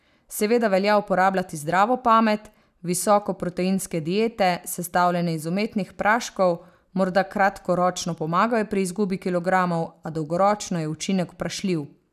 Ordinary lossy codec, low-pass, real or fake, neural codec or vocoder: none; 14.4 kHz; real; none